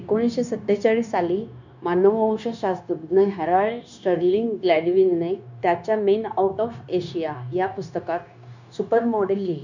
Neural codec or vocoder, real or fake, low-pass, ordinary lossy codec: codec, 16 kHz, 0.9 kbps, LongCat-Audio-Codec; fake; 7.2 kHz; none